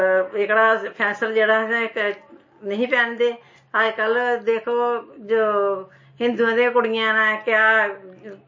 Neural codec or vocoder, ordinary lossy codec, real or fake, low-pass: none; MP3, 32 kbps; real; 7.2 kHz